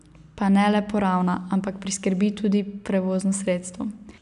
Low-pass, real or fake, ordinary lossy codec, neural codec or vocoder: 10.8 kHz; real; none; none